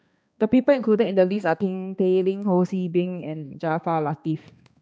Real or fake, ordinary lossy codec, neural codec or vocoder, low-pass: fake; none; codec, 16 kHz, 2 kbps, X-Codec, HuBERT features, trained on balanced general audio; none